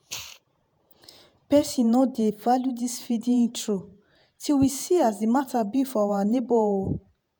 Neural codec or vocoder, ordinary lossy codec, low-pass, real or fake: vocoder, 48 kHz, 128 mel bands, Vocos; none; none; fake